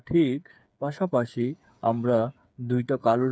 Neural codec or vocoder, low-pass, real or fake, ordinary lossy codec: codec, 16 kHz, 8 kbps, FreqCodec, smaller model; none; fake; none